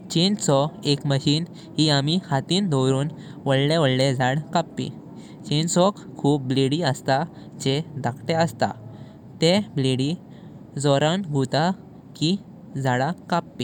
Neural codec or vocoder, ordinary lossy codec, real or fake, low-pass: none; none; real; 19.8 kHz